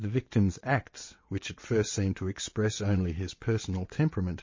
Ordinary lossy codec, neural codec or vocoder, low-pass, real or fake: MP3, 32 kbps; vocoder, 22.05 kHz, 80 mel bands, WaveNeXt; 7.2 kHz; fake